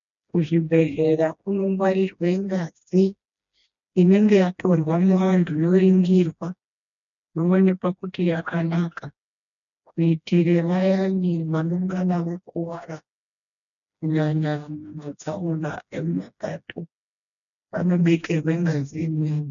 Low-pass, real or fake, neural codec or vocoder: 7.2 kHz; fake; codec, 16 kHz, 1 kbps, FreqCodec, smaller model